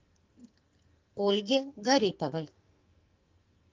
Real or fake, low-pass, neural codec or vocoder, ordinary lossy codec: fake; 7.2 kHz; codec, 44.1 kHz, 2.6 kbps, SNAC; Opus, 32 kbps